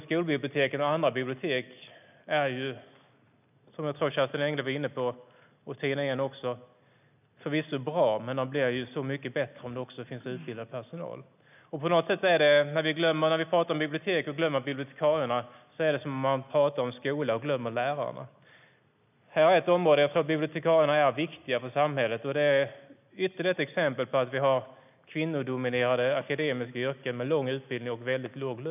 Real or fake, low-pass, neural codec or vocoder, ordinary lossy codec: real; 3.6 kHz; none; none